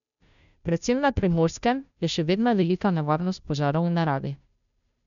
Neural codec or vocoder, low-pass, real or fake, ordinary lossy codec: codec, 16 kHz, 0.5 kbps, FunCodec, trained on Chinese and English, 25 frames a second; 7.2 kHz; fake; none